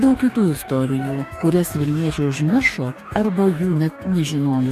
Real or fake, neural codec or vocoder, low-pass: fake; codec, 44.1 kHz, 2.6 kbps, DAC; 14.4 kHz